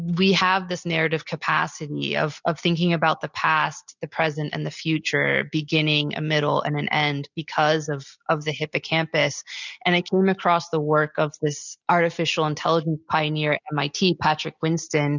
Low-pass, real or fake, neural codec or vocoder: 7.2 kHz; real; none